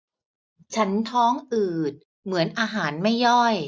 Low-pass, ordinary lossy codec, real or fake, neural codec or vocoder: none; none; real; none